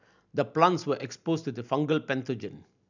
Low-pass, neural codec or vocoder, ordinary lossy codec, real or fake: 7.2 kHz; none; none; real